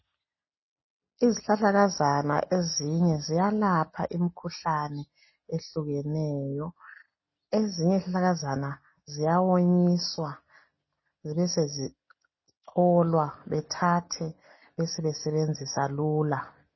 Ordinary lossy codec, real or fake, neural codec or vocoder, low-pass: MP3, 24 kbps; real; none; 7.2 kHz